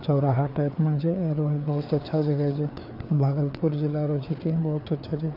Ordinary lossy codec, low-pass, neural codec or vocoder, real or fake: none; 5.4 kHz; codec, 16 kHz, 4 kbps, FreqCodec, larger model; fake